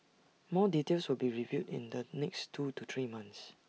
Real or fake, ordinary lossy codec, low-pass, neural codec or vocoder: real; none; none; none